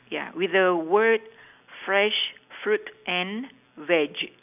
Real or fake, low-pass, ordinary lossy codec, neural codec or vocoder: real; 3.6 kHz; none; none